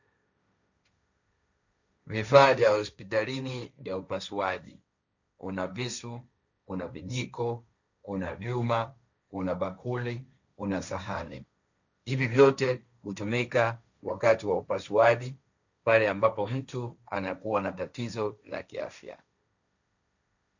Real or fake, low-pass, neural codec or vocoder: fake; 7.2 kHz; codec, 16 kHz, 1.1 kbps, Voila-Tokenizer